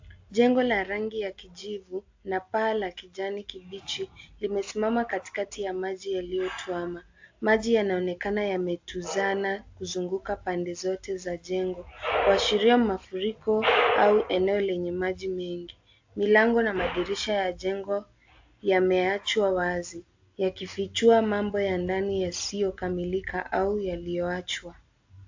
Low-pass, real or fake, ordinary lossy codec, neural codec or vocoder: 7.2 kHz; real; AAC, 48 kbps; none